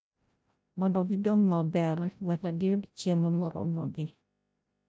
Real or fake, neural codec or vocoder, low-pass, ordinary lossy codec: fake; codec, 16 kHz, 0.5 kbps, FreqCodec, larger model; none; none